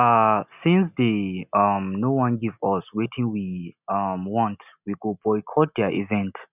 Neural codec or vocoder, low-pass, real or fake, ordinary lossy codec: none; 3.6 kHz; real; none